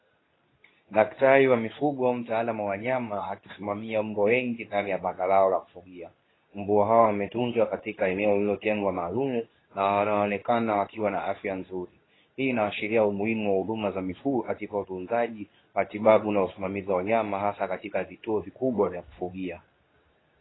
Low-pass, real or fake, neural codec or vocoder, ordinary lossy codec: 7.2 kHz; fake; codec, 24 kHz, 0.9 kbps, WavTokenizer, medium speech release version 2; AAC, 16 kbps